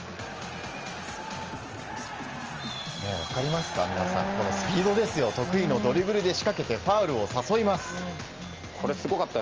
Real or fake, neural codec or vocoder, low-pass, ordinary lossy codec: real; none; 7.2 kHz; Opus, 24 kbps